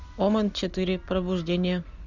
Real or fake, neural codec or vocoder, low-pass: real; none; 7.2 kHz